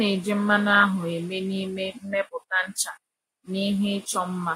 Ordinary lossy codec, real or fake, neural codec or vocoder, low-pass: AAC, 48 kbps; real; none; 19.8 kHz